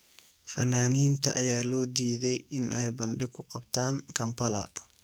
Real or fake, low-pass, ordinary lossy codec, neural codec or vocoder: fake; none; none; codec, 44.1 kHz, 2.6 kbps, SNAC